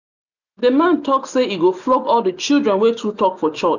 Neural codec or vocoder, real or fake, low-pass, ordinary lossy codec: none; real; 7.2 kHz; none